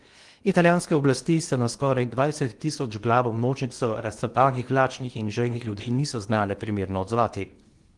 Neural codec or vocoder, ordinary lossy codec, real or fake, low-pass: codec, 16 kHz in and 24 kHz out, 0.8 kbps, FocalCodec, streaming, 65536 codes; Opus, 24 kbps; fake; 10.8 kHz